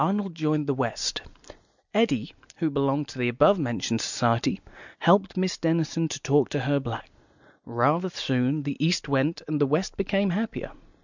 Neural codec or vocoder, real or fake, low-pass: none; real; 7.2 kHz